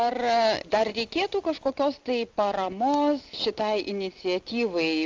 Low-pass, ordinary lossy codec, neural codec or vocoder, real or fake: 7.2 kHz; Opus, 32 kbps; none; real